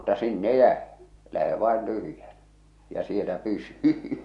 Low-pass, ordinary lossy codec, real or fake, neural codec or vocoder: 10.8 kHz; MP3, 48 kbps; real; none